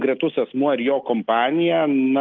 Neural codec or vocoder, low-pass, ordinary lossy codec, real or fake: none; 7.2 kHz; Opus, 32 kbps; real